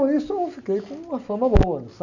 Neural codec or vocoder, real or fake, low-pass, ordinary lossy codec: none; real; 7.2 kHz; none